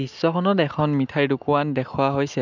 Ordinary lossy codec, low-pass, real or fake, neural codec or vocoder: none; 7.2 kHz; real; none